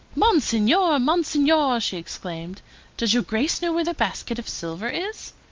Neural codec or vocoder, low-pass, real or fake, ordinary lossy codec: none; 7.2 kHz; real; Opus, 32 kbps